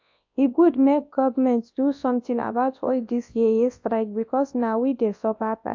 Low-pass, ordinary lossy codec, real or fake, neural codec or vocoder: 7.2 kHz; AAC, 48 kbps; fake; codec, 24 kHz, 0.9 kbps, WavTokenizer, large speech release